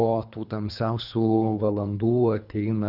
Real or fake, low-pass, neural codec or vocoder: fake; 5.4 kHz; codec, 24 kHz, 3 kbps, HILCodec